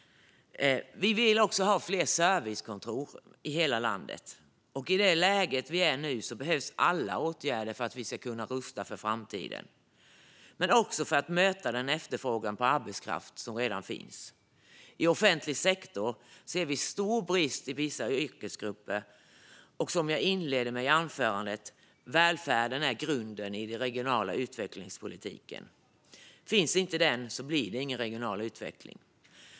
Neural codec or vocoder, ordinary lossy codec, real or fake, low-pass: none; none; real; none